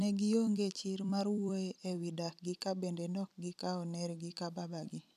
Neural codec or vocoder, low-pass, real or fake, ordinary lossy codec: vocoder, 44.1 kHz, 128 mel bands every 256 samples, BigVGAN v2; 14.4 kHz; fake; none